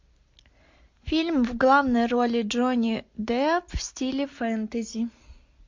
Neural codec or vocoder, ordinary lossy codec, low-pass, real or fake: none; MP3, 48 kbps; 7.2 kHz; real